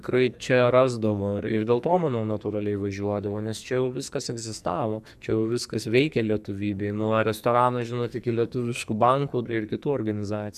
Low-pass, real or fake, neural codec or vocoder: 14.4 kHz; fake; codec, 44.1 kHz, 2.6 kbps, SNAC